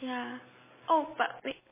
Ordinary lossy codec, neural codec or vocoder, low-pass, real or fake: MP3, 16 kbps; none; 3.6 kHz; real